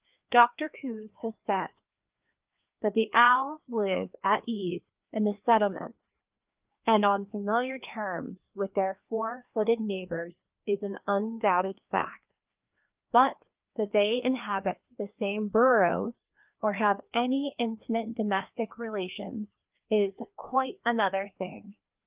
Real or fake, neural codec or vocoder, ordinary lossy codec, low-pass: fake; codec, 16 kHz, 2 kbps, FreqCodec, larger model; Opus, 24 kbps; 3.6 kHz